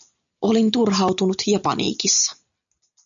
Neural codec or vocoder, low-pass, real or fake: none; 7.2 kHz; real